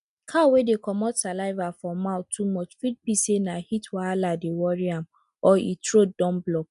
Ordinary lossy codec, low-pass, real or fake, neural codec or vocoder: none; 10.8 kHz; real; none